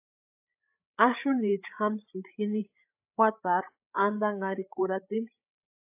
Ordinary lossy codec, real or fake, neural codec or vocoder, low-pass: AAC, 32 kbps; fake; codec, 16 kHz, 8 kbps, FreqCodec, larger model; 3.6 kHz